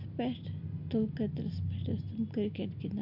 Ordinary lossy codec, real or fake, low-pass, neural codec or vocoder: none; real; 5.4 kHz; none